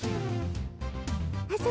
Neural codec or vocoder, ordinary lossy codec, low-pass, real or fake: none; none; none; real